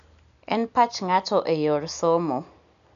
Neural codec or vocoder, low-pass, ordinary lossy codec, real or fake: none; 7.2 kHz; none; real